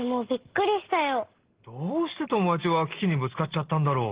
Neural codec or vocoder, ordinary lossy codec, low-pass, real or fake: none; Opus, 16 kbps; 3.6 kHz; real